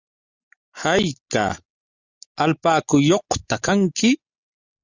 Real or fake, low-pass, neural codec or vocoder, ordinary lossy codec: real; 7.2 kHz; none; Opus, 64 kbps